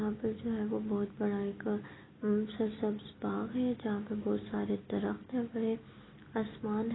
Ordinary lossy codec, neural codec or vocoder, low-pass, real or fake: AAC, 16 kbps; none; 7.2 kHz; real